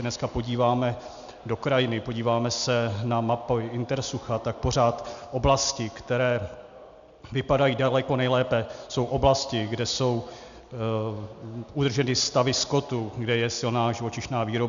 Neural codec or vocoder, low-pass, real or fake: none; 7.2 kHz; real